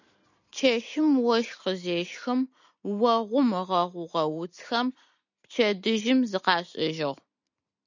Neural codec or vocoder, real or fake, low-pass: none; real; 7.2 kHz